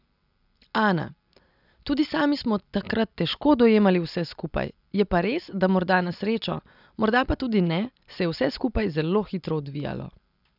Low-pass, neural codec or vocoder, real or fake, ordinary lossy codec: 5.4 kHz; none; real; none